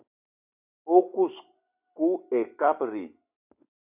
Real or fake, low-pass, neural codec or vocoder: real; 3.6 kHz; none